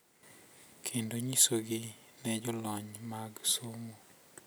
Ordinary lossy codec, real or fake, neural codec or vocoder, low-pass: none; real; none; none